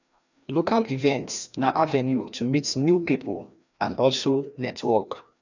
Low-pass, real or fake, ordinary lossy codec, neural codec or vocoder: 7.2 kHz; fake; none; codec, 16 kHz, 1 kbps, FreqCodec, larger model